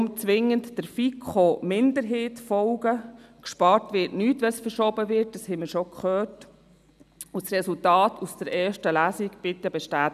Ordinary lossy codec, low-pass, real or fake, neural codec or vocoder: none; 14.4 kHz; real; none